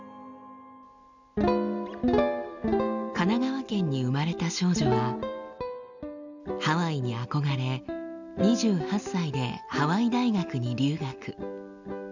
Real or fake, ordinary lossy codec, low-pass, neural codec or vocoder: real; none; 7.2 kHz; none